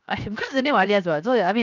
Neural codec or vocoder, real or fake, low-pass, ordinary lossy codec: codec, 16 kHz, 0.7 kbps, FocalCodec; fake; 7.2 kHz; none